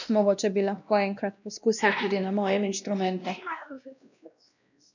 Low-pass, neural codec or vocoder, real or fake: 7.2 kHz; codec, 16 kHz, 1 kbps, X-Codec, WavLM features, trained on Multilingual LibriSpeech; fake